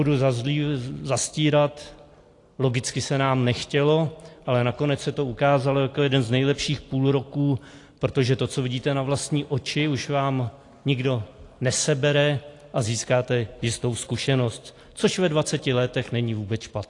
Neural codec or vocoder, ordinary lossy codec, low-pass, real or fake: none; AAC, 48 kbps; 10.8 kHz; real